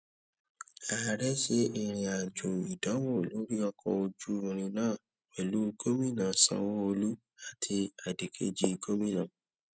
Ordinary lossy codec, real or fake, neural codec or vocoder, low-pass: none; real; none; none